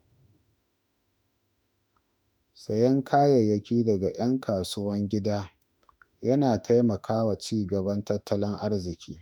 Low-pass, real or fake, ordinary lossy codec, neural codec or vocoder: 19.8 kHz; fake; none; autoencoder, 48 kHz, 32 numbers a frame, DAC-VAE, trained on Japanese speech